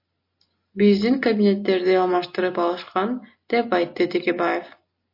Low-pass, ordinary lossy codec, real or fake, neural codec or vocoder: 5.4 kHz; MP3, 32 kbps; real; none